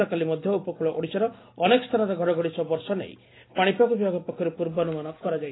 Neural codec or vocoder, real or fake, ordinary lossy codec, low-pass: none; real; AAC, 16 kbps; 7.2 kHz